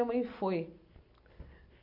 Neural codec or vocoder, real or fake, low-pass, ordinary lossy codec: none; real; 5.4 kHz; AAC, 48 kbps